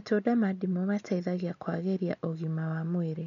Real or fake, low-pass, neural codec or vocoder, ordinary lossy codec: real; 7.2 kHz; none; none